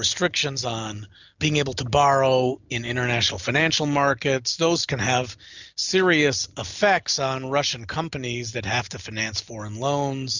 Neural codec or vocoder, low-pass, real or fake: none; 7.2 kHz; real